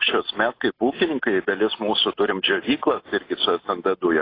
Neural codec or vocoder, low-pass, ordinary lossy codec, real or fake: none; 5.4 kHz; AAC, 24 kbps; real